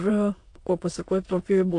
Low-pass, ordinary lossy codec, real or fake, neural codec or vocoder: 9.9 kHz; AAC, 48 kbps; fake; autoencoder, 22.05 kHz, a latent of 192 numbers a frame, VITS, trained on many speakers